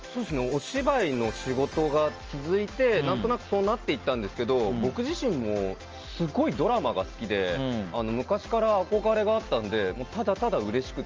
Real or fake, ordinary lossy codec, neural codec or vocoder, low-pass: real; Opus, 24 kbps; none; 7.2 kHz